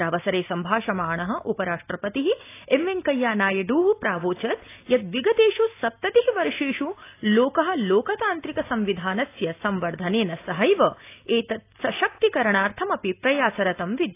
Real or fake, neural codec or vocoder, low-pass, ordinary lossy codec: real; none; 3.6 kHz; AAC, 24 kbps